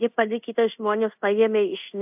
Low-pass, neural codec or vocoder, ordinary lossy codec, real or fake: 3.6 kHz; codec, 24 kHz, 0.5 kbps, DualCodec; AAC, 32 kbps; fake